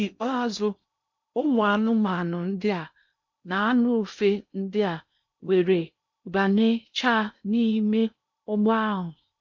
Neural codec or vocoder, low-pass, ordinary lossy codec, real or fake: codec, 16 kHz in and 24 kHz out, 0.6 kbps, FocalCodec, streaming, 2048 codes; 7.2 kHz; MP3, 64 kbps; fake